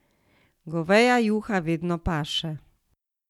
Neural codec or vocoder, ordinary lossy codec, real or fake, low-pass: none; none; real; 19.8 kHz